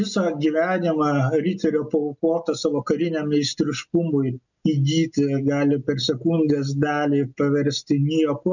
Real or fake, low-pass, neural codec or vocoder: real; 7.2 kHz; none